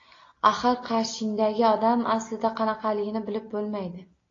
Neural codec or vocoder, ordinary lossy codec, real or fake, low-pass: none; AAC, 32 kbps; real; 7.2 kHz